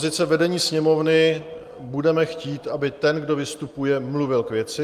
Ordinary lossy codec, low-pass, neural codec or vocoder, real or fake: Opus, 32 kbps; 14.4 kHz; none; real